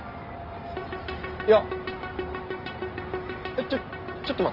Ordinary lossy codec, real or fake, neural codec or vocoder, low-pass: Opus, 24 kbps; real; none; 5.4 kHz